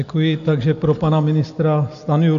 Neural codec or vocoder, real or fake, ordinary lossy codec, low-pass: none; real; AAC, 64 kbps; 7.2 kHz